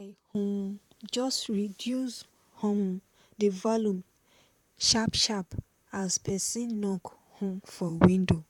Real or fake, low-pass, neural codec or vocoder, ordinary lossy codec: fake; 19.8 kHz; vocoder, 44.1 kHz, 128 mel bands, Pupu-Vocoder; none